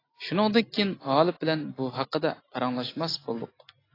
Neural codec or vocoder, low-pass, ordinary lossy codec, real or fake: none; 5.4 kHz; AAC, 24 kbps; real